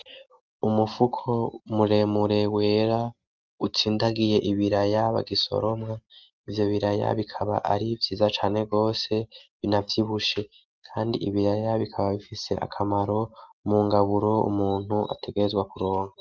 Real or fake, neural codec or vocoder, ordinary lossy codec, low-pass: real; none; Opus, 32 kbps; 7.2 kHz